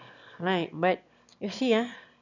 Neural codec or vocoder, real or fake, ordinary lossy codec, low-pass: autoencoder, 22.05 kHz, a latent of 192 numbers a frame, VITS, trained on one speaker; fake; none; 7.2 kHz